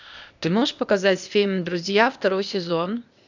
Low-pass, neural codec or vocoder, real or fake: 7.2 kHz; codec, 16 kHz, 0.8 kbps, ZipCodec; fake